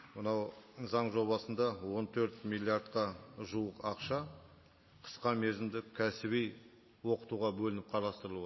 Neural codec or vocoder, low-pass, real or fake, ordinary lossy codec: none; 7.2 kHz; real; MP3, 24 kbps